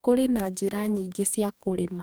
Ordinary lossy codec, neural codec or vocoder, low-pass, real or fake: none; codec, 44.1 kHz, 2.6 kbps, DAC; none; fake